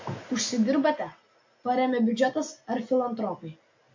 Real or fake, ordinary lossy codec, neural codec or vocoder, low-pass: real; MP3, 48 kbps; none; 7.2 kHz